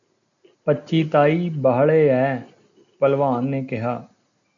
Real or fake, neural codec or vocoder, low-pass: real; none; 7.2 kHz